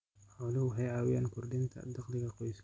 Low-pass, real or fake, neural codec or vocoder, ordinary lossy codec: none; real; none; none